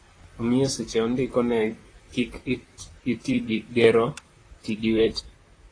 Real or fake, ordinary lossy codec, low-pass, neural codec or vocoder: fake; AAC, 32 kbps; 9.9 kHz; vocoder, 44.1 kHz, 128 mel bands, Pupu-Vocoder